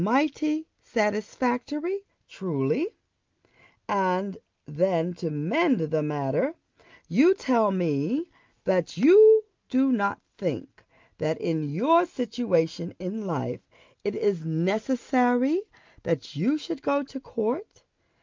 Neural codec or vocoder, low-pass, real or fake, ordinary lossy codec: none; 7.2 kHz; real; Opus, 24 kbps